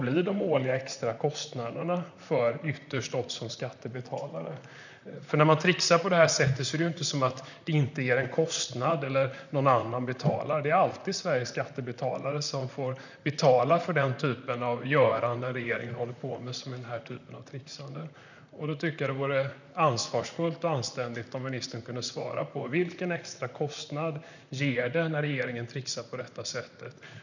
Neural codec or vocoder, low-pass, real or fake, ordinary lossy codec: vocoder, 44.1 kHz, 128 mel bands, Pupu-Vocoder; 7.2 kHz; fake; none